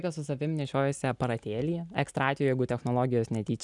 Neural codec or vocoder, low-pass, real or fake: none; 10.8 kHz; real